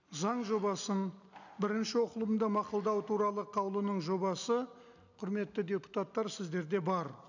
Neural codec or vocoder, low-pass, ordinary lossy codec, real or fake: none; 7.2 kHz; none; real